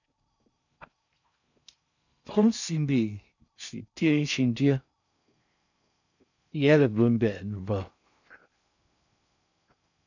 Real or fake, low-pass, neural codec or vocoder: fake; 7.2 kHz; codec, 16 kHz in and 24 kHz out, 0.6 kbps, FocalCodec, streaming, 4096 codes